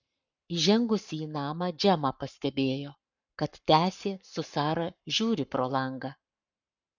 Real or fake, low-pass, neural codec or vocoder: fake; 7.2 kHz; codec, 44.1 kHz, 7.8 kbps, Pupu-Codec